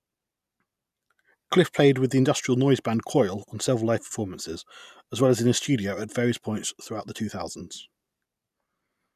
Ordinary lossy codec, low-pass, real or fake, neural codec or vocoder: none; 14.4 kHz; real; none